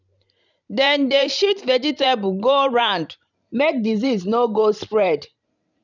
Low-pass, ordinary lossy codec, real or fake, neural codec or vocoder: 7.2 kHz; none; fake; vocoder, 44.1 kHz, 128 mel bands every 512 samples, BigVGAN v2